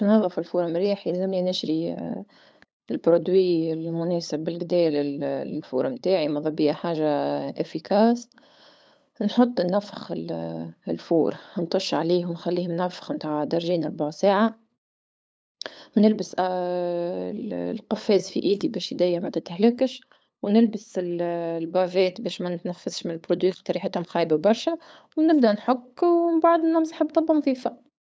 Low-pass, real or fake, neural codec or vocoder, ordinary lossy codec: none; fake; codec, 16 kHz, 8 kbps, FunCodec, trained on LibriTTS, 25 frames a second; none